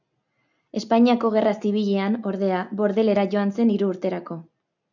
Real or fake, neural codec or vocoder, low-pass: real; none; 7.2 kHz